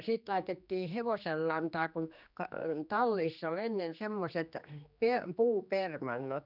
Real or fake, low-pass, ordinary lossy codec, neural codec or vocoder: fake; 5.4 kHz; none; codec, 16 kHz, 2 kbps, X-Codec, HuBERT features, trained on general audio